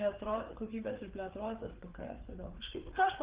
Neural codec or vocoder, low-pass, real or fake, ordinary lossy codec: codec, 16 kHz, 4 kbps, FreqCodec, larger model; 3.6 kHz; fake; Opus, 16 kbps